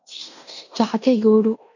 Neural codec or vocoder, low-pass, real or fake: codec, 16 kHz in and 24 kHz out, 0.9 kbps, LongCat-Audio-Codec, fine tuned four codebook decoder; 7.2 kHz; fake